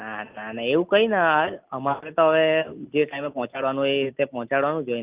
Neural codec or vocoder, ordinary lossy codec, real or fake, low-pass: none; Opus, 24 kbps; real; 3.6 kHz